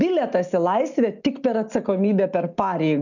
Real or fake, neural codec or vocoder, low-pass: real; none; 7.2 kHz